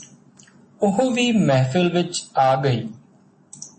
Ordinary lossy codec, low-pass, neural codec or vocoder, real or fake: MP3, 32 kbps; 10.8 kHz; none; real